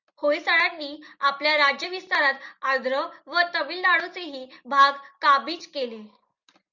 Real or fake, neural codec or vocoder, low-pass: real; none; 7.2 kHz